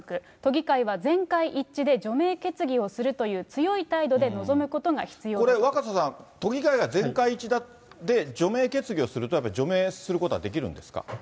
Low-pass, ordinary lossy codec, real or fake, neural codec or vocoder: none; none; real; none